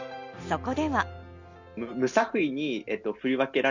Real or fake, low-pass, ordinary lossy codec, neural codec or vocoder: real; 7.2 kHz; none; none